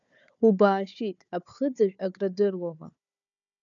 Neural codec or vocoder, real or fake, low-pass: codec, 16 kHz, 4 kbps, FunCodec, trained on Chinese and English, 50 frames a second; fake; 7.2 kHz